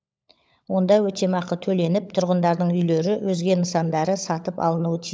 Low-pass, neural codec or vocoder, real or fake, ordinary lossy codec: none; codec, 16 kHz, 16 kbps, FunCodec, trained on LibriTTS, 50 frames a second; fake; none